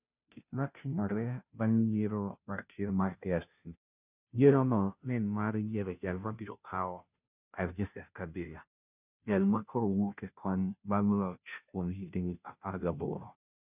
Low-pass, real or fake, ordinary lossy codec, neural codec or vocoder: 3.6 kHz; fake; none; codec, 16 kHz, 0.5 kbps, FunCodec, trained on Chinese and English, 25 frames a second